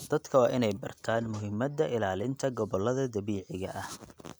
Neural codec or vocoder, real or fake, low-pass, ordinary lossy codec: none; real; none; none